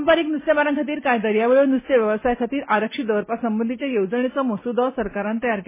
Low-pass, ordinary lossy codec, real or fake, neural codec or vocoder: 3.6 kHz; MP3, 16 kbps; real; none